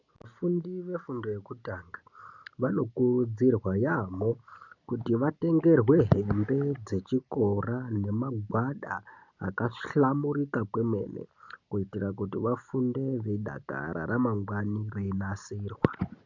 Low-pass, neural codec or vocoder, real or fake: 7.2 kHz; none; real